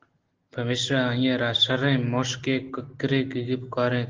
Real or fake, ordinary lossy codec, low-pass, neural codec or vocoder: real; Opus, 16 kbps; 7.2 kHz; none